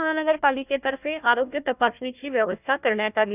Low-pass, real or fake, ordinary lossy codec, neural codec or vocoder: 3.6 kHz; fake; none; codec, 16 kHz, 1 kbps, FunCodec, trained on Chinese and English, 50 frames a second